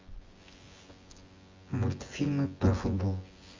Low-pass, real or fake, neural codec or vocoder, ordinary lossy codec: 7.2 kHz; fake; vocoder, 24 kHz, 100 mel bands, Vocos; Opus, 32 kbps